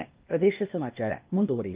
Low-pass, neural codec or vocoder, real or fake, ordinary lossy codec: 3.6 kHz; codec, 16 kHz, 0.8 kbps, ZipCodec; fake; Opus, 32 kbps